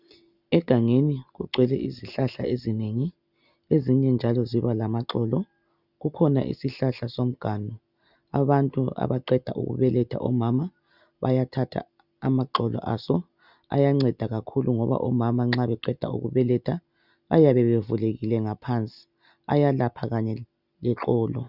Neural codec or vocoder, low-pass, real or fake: none; 5.4 kHz; real